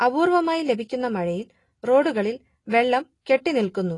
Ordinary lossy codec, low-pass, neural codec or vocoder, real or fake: AAC, 32 kbps; 10.8 kHz; none; real